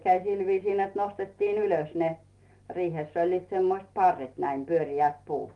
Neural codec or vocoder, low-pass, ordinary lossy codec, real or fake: none; 10.8 kHz; Opus, 24 kbps; real